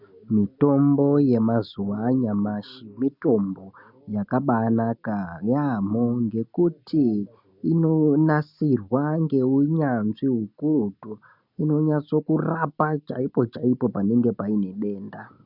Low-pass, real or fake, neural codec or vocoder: 5.4 kHz; real; none